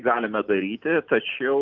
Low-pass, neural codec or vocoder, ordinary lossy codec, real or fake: 7.2 kHz; none; Opus, 24 kbps; real